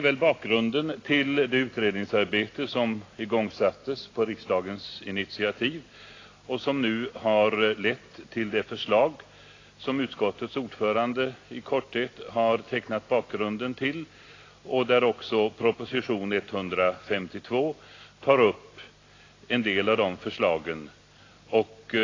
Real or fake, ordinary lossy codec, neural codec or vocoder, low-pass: real; AAC, 32 kbps; none; 7.2 kHz